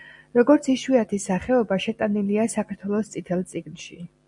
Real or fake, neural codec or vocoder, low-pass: real; none; 10.8 kHz